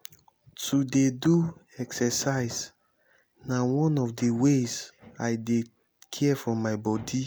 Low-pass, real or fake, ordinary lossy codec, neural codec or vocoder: none; real; none; none